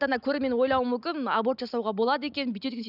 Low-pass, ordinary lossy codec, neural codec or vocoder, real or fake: 5.4 kHz; none; none; real